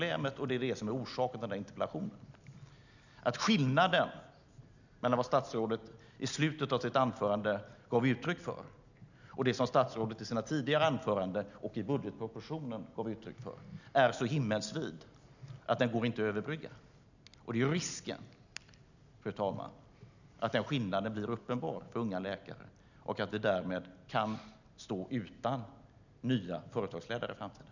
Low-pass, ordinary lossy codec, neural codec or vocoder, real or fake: 7.2 kHz; none; none; real